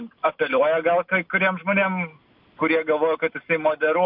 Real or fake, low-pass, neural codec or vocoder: real; 5.4 kHz; none